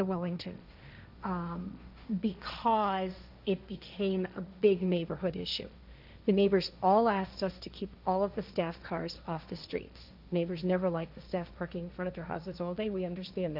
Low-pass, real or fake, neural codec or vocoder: 5.4 kHz; fake; codec, 16 kHz, 1.1 kbps, Voila-Tokenizer